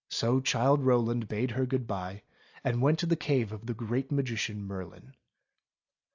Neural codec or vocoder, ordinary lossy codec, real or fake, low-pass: none; AAC, 48 kbps; real; 7.2 kHz